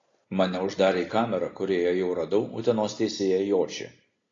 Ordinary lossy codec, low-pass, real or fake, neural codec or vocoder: AAC, 32 kbps; 7.2 kHz; real; none